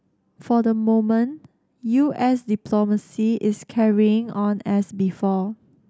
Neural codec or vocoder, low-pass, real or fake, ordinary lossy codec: none; none; real; none